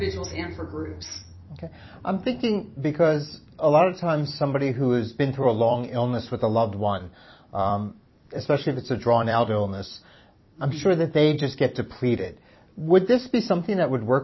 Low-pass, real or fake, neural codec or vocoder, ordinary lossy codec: 7.2 kHz; fake; vocoder, 44.1 kHz, 128 mel bands every 256 samples, BigVGAN v2; MP3, 24 kbps